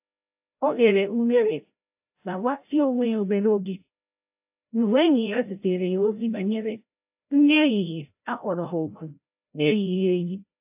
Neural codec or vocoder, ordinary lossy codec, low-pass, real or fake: codec, 16 kHz, 0.5 kbps, FreqCodec, larger model; AAC, 32 kbps; 3.6 kHz; fake